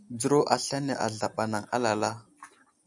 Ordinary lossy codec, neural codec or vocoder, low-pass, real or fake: MP3, 96 kbps; none; 10.8 kHz; real